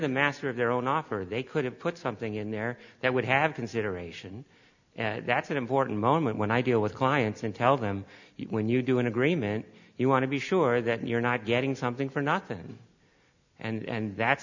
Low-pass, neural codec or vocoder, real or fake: 7.2 kHz; none; real